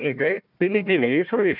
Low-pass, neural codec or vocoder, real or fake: 5.4 kHz; codec, 16 kHz, 1 kbps, FunCodec, trained on Chinese and English, 50 frames a second; fake